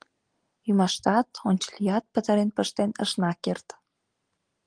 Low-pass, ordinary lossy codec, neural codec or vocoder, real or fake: 9.9 kHz; Opus, 24 kbps; none; real